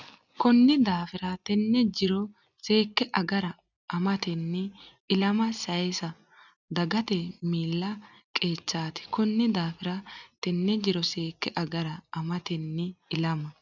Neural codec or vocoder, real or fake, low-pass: none; real; 7.2 kHz